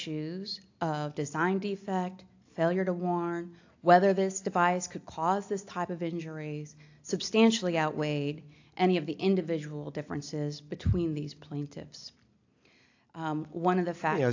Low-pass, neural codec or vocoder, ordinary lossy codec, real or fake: 7.2 kHz; none; AAC, 48 kbps; real